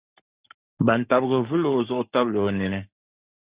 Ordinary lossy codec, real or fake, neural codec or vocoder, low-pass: Opus, 64 kbps; fake; codec, 44.1 kHz, 7.8 kbps, Pupu-Codec; 3.6 kHz